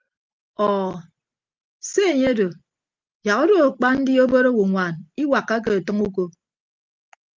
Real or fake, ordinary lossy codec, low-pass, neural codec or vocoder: real; Opus, 32 kbps; 7.2 kHz; none